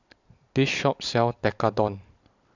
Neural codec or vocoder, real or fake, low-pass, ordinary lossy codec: vocoder, 44.1 kHz, 128 mel bands every 256 samples, BigVGAN v2; fake; 7.2 kHz; none